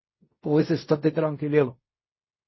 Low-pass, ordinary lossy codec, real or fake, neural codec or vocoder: 7.2 kHz; MP3, 24 kbps; fake; codec, 16 kHz in and 24 kHz out, 0.4 kbps, LongCat-Audio-Codec, fine tuned four codebook decoder